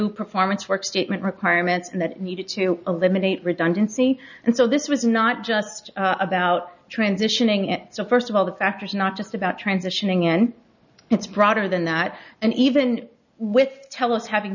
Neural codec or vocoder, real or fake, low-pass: none; real; 7.2 kHz